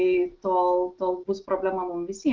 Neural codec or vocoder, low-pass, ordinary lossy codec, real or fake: none; 7.2 kHz; Opus, 16 kbps; real